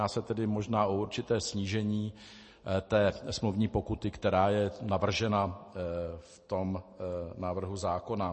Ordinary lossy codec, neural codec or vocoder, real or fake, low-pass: MP3, 32 kbps; none; real; 10.8 kHz